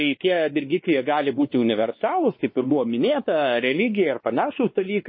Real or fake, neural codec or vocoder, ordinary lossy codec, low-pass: fake; codec, 16 kHz, 2 kbps, X-Codec, WavLM features, trained on Multilingual LibriSpeech; MP3, 24 kbps; 7.2 kHz